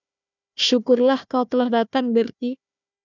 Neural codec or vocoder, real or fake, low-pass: codec, 16 kHz, 1 kbps, FunCodec, trained on Chinese and English, 50 frames a second; fake; 7.2 kHz